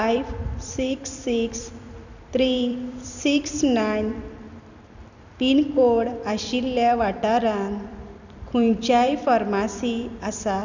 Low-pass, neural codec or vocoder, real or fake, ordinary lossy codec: 7.2 kHz; vocoder, 44.1 kHz, 128 mel bands every 256 samples, BigVGAN v2; fake; none